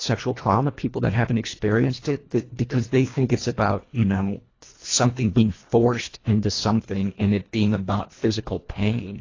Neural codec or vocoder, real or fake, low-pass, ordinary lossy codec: codec, 24 kHz, 1.5 kbps, HILCodec; fake; 7.2 kHz; AAC, 32 kbps